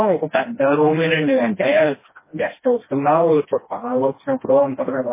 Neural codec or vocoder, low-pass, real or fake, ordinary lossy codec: codec, 16 kHz, 1 kbps, FreqCodec, smaller model; 3.6 kHz; fake; MP3, 16 kbps